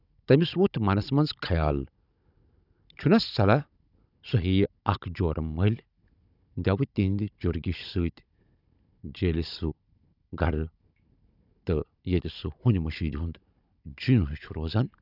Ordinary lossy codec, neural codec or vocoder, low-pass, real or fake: none; codec, 16 kHz, 16 kbps, FunCodec, trained on Chinese and English, 50 frames a second; 5.4 kHz; fake